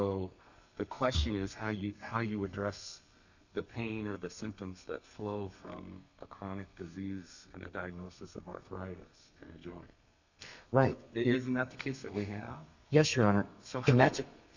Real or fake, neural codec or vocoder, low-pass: fake; codec, 32 kHz, 1.9 kbps, SNAC; 7.2 kHz